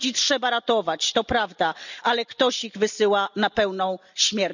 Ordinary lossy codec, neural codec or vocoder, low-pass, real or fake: none; none; 7.2 kHz; real